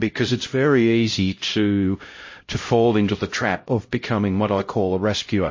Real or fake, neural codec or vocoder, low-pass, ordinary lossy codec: fake; codec, 16 kHz, 0.5 kbps, X-Codec, HuBERT features, trained on LibriSpeech; 7.2 kHz; MP3, 32 kbps